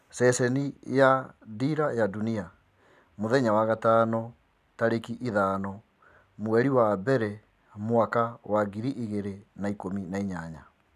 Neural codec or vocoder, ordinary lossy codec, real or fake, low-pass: none; none; real; 14.4 kHz